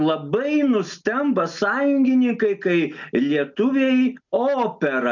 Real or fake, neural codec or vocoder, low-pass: real; none; 7.2 kHz